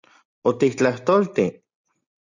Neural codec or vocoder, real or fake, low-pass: none; real; 7.2 kHz